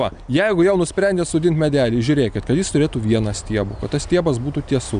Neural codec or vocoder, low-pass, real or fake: none; 9.9 kHz; real